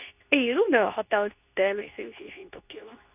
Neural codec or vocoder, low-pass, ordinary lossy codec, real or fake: codec, 24 kHz, 0.9 kbps, WavTokenizer, medium speech release version 2; 3.6 kHz; none; fake